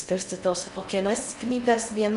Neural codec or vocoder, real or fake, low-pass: codec, 16 kHz in and 24 kHz out, 0.6 kbps, FocalCodec, streaming, 4096 codes; fake; 10.8 kHz